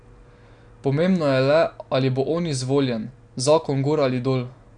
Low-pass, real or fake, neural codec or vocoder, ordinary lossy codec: 9.9 kHz; real; none; none